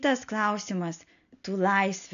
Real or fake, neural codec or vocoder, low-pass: real; none; 7.2 kHz